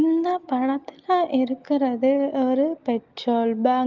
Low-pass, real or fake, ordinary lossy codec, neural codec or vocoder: 7.2 kHz; real; Opus, 32 kbps; none